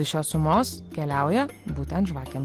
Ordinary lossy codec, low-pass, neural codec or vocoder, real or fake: Opus, 16 kbps; 14.4 kHz; none; real